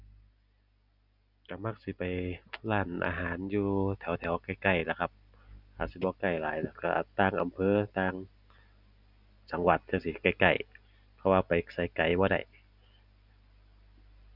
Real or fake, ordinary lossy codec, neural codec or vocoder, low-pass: real; none; none; 5.4 kHz